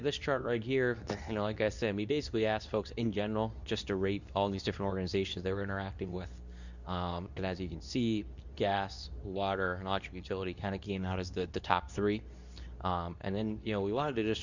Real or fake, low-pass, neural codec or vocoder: fake; 7.2 kHz; codec, 24 kHz, 0.9 kbps, WavTokenizer, medium speech release version 2